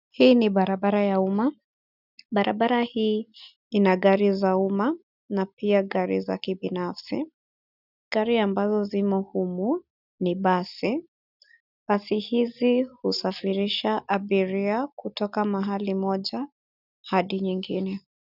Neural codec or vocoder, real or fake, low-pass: none; real; 5.4 kHz